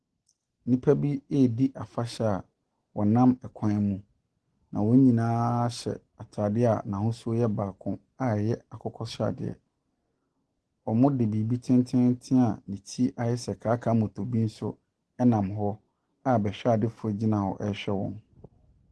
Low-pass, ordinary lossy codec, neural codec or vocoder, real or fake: 10.8 kHz; Opus, 16 kbps; none; real